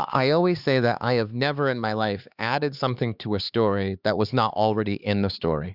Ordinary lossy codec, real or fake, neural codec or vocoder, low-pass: Opus, 64 kbps; fake; codec, 16 kHz, 4 kbps, X-Codec, HuBERT features, trained on LibriSpeech; 5.4 kHz